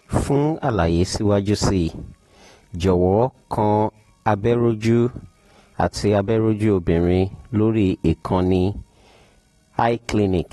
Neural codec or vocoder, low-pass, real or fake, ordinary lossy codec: none; 19.8 kHz; real; AAC, 32 kbps